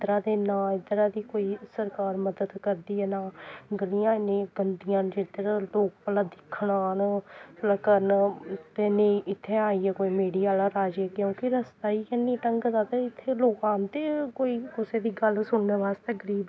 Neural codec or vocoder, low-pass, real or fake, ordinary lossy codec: none; none; real; none